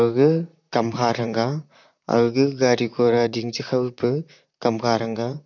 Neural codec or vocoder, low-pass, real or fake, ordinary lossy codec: vocoder, 44.1 kHz, 128 mel bands, Pupu-Vocoder; 7.2 kHz; fake; none